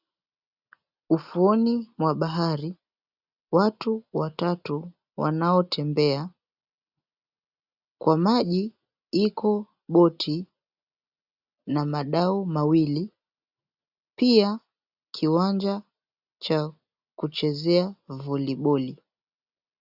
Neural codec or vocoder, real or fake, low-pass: none; real; 5.4 kHz